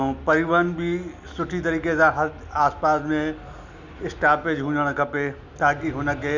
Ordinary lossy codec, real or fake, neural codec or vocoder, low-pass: none; real; none; 7.2 kHz